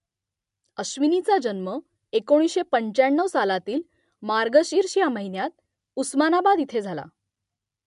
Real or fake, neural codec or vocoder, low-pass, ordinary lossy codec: real; none; 10.8 kHz; MP3, 64 kbps